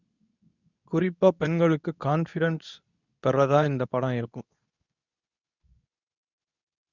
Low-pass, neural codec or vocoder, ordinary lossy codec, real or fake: 7.2 kHz; codec, 24 kHz, 0.9 kbps, WavTokenizer, medium speech release version 2; none; fake